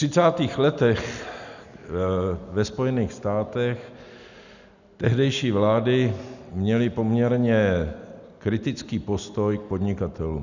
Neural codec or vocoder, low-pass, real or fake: none; 7.2 kHz; real